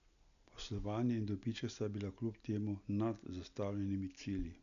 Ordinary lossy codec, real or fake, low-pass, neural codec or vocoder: none; real; 7.2 kHz; none